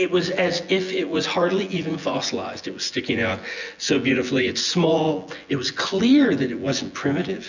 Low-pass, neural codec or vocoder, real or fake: 7.2 kHz; vocoder, 24 kHz, 100 mel bands, Vocos; fake